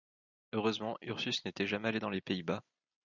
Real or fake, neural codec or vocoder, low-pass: fake; vocoder, 44.1 kHz, 128 mel bands every 256 samples, BigVGAN v2; 7.2 kHz